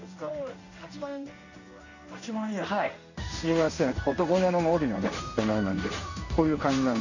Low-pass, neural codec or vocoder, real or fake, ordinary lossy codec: 7.2 kHz; codec, 16 kHz in and 24 kHz out, 1 kbps, XY-Tokenizer; fake; none